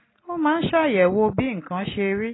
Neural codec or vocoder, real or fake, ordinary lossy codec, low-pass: none; real; AAC, 16 kbps; 7.2 kHz